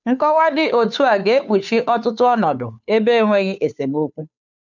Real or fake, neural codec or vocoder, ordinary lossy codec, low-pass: fake; codec, 16 kHz, 2 kbps, FunCodec, trained on Chinese and English, 25 frames a second; none; 7.2 kHz